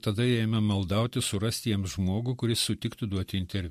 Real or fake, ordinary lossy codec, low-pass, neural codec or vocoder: real; MP3, 64 kbps; 14.4 kHz; none